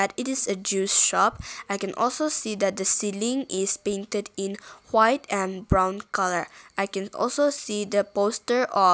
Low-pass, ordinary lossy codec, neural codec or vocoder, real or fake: none; none; none; real